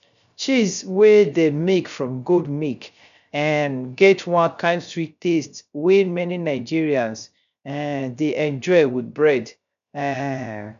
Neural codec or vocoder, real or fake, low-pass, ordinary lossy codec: codec, 16 kHz, 0.3 kbps, FocalCodec; fake; 7.2 kHz; none